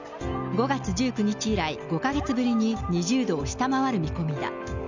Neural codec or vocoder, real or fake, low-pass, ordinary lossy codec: none; real; 7.2 kHz; none